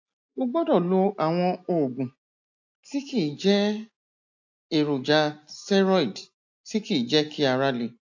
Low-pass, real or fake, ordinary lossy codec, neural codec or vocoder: 7.2 kHz; real; AAC, 48 kbps; none